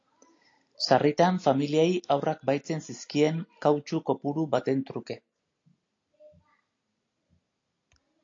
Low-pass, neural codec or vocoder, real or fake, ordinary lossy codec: 7.2 kHz; none; real; AAC, 48 kbps